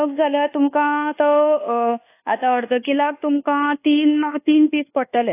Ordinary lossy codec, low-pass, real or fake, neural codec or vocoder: AAC, 24 kbps; 3.6 kHz; fake; codec, 24 kHz, 1.2 kbps, DualCodec